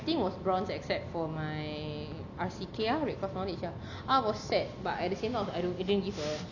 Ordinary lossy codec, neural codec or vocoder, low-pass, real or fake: none; none; 7.2 kHz; real